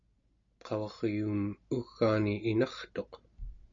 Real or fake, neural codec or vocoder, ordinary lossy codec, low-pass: real; none; MP3, 64 kbps; 7.2 kHz